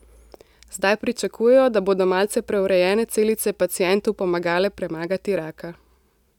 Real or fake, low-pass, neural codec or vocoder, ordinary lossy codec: real; 19.8 kHz; none; none